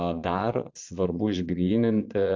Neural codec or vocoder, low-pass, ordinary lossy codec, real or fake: vocoder, 22.05 kHz, 80 mel bands, Vocos; 7.2 kHz; AAC, 48 kbps; fake